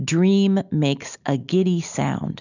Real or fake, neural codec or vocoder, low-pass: real; none; 7.2 kHz